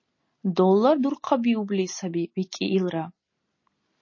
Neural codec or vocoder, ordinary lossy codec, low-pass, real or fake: none; MP3, 32 kbps; 7.2 kHz; real